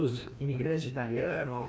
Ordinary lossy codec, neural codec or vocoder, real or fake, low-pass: none; codec, 16 kHz, 1 kbps, FreqCodec, larger model; fake; none